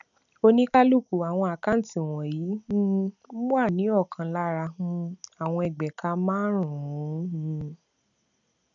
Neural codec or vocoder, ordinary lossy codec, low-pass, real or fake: none; none; 7.2 kHz; real